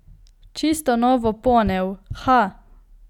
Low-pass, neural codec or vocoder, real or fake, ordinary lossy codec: 19.8 kHz; none; real; none